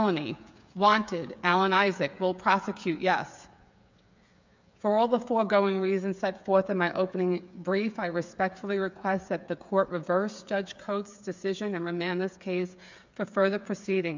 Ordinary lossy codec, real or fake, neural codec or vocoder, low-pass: MP3, 64 kbps; fake; codec, 16 kHz, 8 kbps, FreqCodec, smaller model; 7.2 kHz